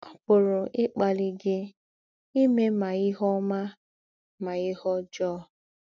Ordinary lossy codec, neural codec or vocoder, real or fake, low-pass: none; none; real; 7.2 kHz